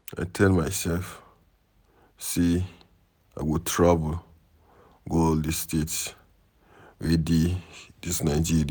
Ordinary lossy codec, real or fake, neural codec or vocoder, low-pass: none; real; none; none